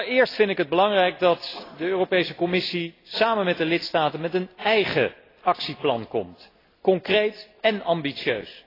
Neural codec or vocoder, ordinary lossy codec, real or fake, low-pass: none; AAC, 24 kbps; real; 5.4 kHz